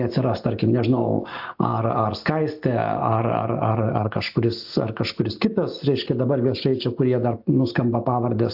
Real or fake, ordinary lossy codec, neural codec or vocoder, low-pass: real; AAC, 48 kbps; none; 5.4 kHz